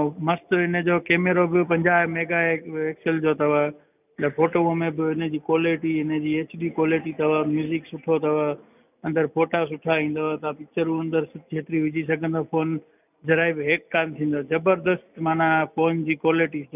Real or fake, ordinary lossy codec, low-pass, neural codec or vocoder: real; none; 3.6 kHz; none